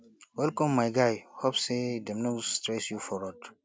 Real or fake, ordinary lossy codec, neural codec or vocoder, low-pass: real; none; none; none